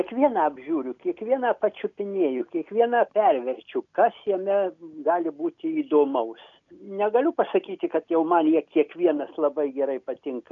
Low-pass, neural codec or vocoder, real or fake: 7.2 kHz; none; real